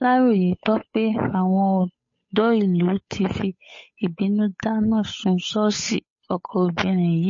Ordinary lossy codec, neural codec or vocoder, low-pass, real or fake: MP3, 32 kbps; codec, 16 kHz, 8 kbps, FunCodec, trained on Chinese and English, 25 frames a second; 7.2 kHz; fake